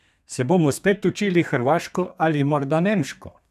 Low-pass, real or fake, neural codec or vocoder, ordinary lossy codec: 14.4 kHz; fake; codec, 32 kHz, 1.9 kbps, SNAC; none